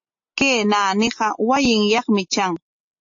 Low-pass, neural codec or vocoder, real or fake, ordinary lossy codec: 7.2 kHz; none; real; AAC, 48 kbps